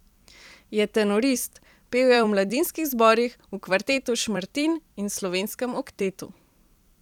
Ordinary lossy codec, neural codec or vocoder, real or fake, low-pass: none; vocoder, 44.1 kHz, 128 mel bands every 256 samples, BigVGAN v2; fake; 19.8 kHz